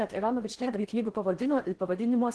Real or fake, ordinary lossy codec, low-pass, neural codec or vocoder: fake; Opus, 16 kbps; 10.8 kHz; codec, 16 kHz in and 24 kHz out, 0.6 kbps, FocalCodec, streaming, 4096 codes